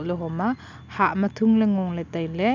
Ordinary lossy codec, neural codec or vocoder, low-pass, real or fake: none; none; 7.2 kHz; real